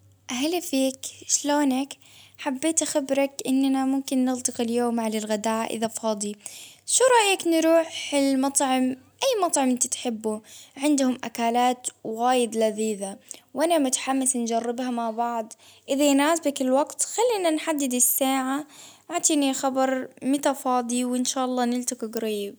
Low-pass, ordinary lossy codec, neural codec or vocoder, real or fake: none; none; none; real